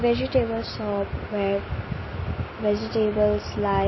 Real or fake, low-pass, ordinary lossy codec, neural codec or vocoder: real; 7.2 kHz; MP3, 24 kbps; none